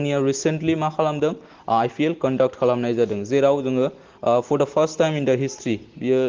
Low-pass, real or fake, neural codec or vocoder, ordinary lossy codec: 7.2 kHz; real; none; Opus, 16 kbps